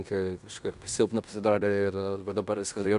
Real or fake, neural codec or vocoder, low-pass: fake; codec, 16 kHz in and 24 kHz out, 0.9 kbps, LongCat-Audio-Codec, fine tuned four codebook decoder; 10.8 kHz